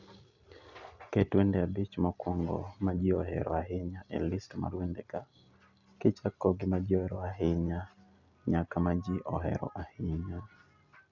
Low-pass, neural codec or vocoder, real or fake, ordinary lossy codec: 7.2 kHz; none; real; none